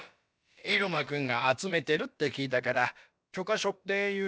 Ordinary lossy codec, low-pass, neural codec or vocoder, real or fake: none; none; codec, 16 kHz, about 1 kbps, DyCAST, with the encoder's durations; fake